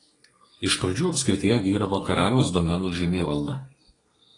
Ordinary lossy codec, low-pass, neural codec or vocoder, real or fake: AAC, 32 kbps; 10.8 kHz; codec, 32 kHz, 1.9 kbps, SNAC; fake